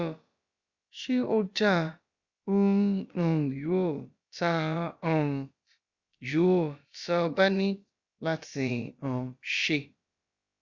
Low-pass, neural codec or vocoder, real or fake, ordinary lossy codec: 7.2 kHz; codec, 16 kHz, about 1 kbps, DyCAST, with the encoder's durations; fake; Opus, 64 kbps